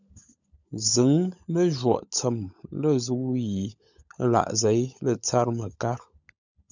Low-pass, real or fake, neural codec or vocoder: 7.2 kHz; fake; codec, 16 kHz, 16 kbps, FunCodec, trained on LibriTTS, 50 frames a second